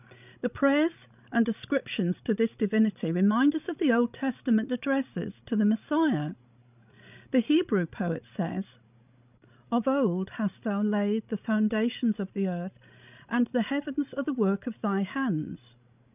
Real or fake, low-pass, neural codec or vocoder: fake; 3.6 kHz; codec, 16 kHz, 16 kbps, FreqCodec, larger model